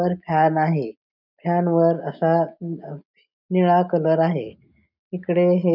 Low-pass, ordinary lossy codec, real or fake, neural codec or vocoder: 5.4 kHz; none; real; none